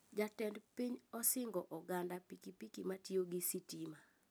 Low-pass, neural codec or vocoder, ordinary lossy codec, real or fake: none; none; none; real